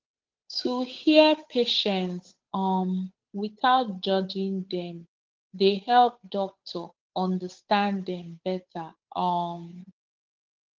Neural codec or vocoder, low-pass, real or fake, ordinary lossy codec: codec, 16 kHz, 8 kbps, FunCodec, trained on Chinese and English, 25 frames a second; 7.2 kHz; fake; Opus, 32 kbps